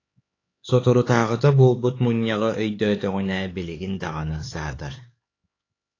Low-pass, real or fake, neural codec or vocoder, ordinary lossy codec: 7.2 kHz; fake; codec, 16 kHz, 4 kbps, X-Codec, HuBERT features, trained on LibriSpeech; AAC, 32 kbps